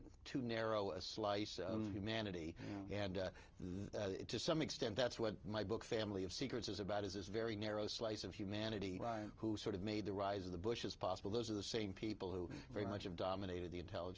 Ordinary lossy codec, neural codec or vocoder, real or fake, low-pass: Opus, 24 kbps; none; real; 7.2 kHz